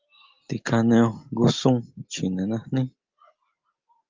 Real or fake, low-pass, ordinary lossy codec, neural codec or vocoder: real; 7.2 kHz; Opus, 24 kbps; none